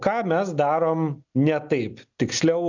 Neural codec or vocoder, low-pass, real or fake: none; 7.2 kHz; real